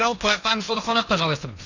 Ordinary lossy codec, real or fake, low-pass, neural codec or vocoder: none; fake; 7.2 kHz; codec, 16 kHz in and 24 kHz out, 0.8 kbps, FocalCodec, streaming, 65536 codes